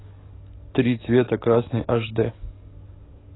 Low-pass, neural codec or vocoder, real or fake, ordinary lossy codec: 7.2 kHz; codec, 44.1 kHz, 7.8 kbps, DAC; fake; AAC, 16 kbps